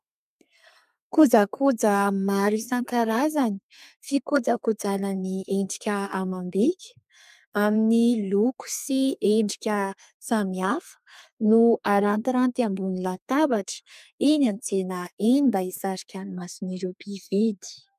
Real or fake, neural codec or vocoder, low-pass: fake; codec, 32 kHz, 1.9 kbps, SNAC; 14.4 kHz